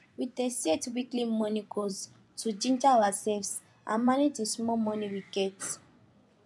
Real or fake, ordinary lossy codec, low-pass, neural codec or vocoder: fake; none; none; vocoder, 24 kHz, 100 mel bands, Vocos